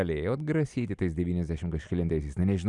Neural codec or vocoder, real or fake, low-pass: none; real; 10.8 kHz